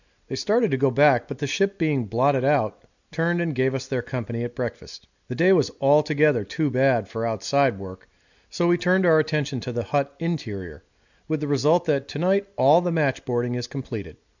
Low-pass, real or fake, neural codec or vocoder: 7.2 kHz; real; none